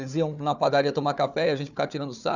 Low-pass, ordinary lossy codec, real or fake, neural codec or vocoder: 7.2 kHz; none; fake; codec, 16 kHz, 4 kbps, FunCodec, trained on Chinese and English, 50 frames a second